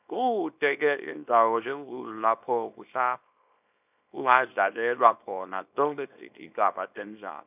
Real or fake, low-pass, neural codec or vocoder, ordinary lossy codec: fake; 3.6 kHz; codec, 24 kHz, 0.9 kbps, WavTokenizer, small release; none